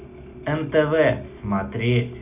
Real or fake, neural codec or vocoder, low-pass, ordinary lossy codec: real; none; 3.6 kHz; none